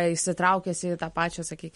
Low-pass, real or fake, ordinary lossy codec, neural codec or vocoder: 19.8 kHz; real; MP3, 48 kbps; none